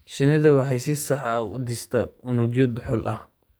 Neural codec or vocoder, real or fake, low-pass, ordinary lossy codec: codec, 44.1 kHz, 2.6 kbps, SNAC; fake; none; none